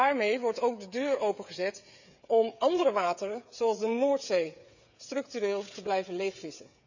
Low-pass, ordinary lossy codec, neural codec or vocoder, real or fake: 7.2 kHz; none; codec, 16 kHz, 16 kbps, FreqCodec, smaller model; fake